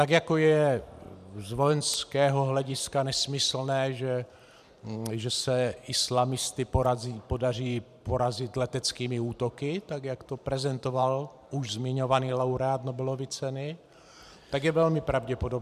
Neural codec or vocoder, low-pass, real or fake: vocoder, 44.1 kHz, 128 mel bands every 512 samples, BigVGAN v2; 14.4 kHz; fake